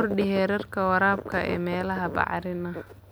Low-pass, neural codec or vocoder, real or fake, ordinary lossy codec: none; none; real; none